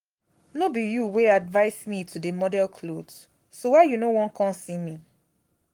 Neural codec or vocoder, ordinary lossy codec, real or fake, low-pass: codec, 44.1 kHz, 7.8 kbps, Pupu-Codec; Opus, 32 kbps; fake; 19.8 kHz